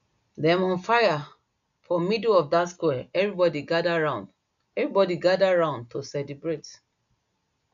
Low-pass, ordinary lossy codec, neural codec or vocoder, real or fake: 7.2 kHz; none; none; real